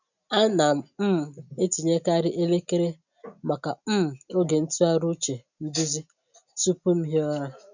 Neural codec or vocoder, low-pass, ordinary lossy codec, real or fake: none; 7.2 kHz; none; real